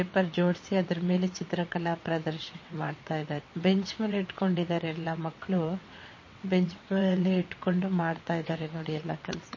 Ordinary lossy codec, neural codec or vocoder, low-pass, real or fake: MP3, 32 kbps; vocoder, 22.05 kHz, 80 mel bands, WaveNeXt; 7.2 kHz; fake